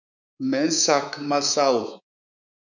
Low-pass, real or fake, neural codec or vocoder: 7.2 kHz; fake; codec, 24 kHz, 3.1 kbps, DualCodec